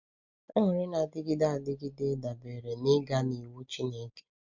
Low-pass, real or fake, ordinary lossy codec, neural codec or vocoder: 7.2 kHz; real; none; none